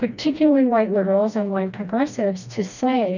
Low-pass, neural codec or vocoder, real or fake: 7.2 kHz; codec, 16 kHz, 1 kbps, FreqCodec, smaller model; fake